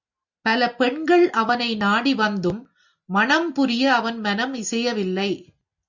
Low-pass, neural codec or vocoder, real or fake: 7.2 kHz; none; real